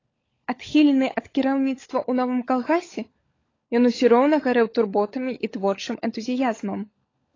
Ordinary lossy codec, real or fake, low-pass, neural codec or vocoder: AAC, 32 kbps; fake; 7.2 kHz; codec, 16 kHz, 16 kbps, FunCodec, trained on LibriTTS, 50 frames a second